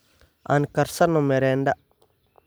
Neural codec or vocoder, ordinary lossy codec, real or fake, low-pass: vocoder, 44.1 kHz, 128 mel bands every 256 samples, BigVGAN v2; none; fake; none